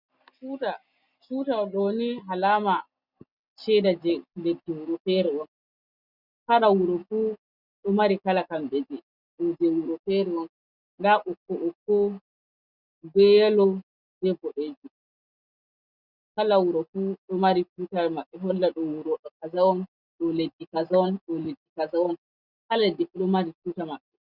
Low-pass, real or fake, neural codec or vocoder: 5.4 kHz; real; none